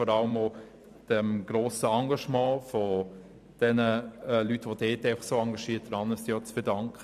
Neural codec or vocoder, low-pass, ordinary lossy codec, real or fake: vocoder, 44.1 kHz, 128 mel bands every 512 samples, BigVGAN v2; 14.4 kHz; none; fake